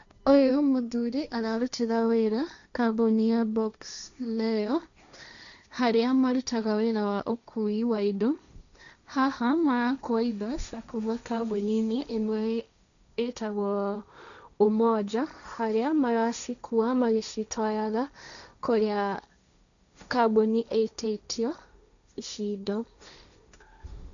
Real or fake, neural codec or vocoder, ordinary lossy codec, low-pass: fake; codec, 16 kHz, 1.1 kbps, Voila-Tokenizer; none; 7.2 kHz